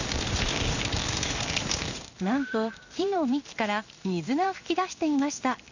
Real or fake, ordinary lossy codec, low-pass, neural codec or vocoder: fake; none; 7.2 kHz; codec, 16 kHz in and 24 kHz out, 1 kbps, XY-Tokenizer